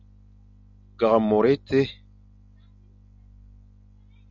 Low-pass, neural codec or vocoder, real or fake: 7.2 kHz; none; real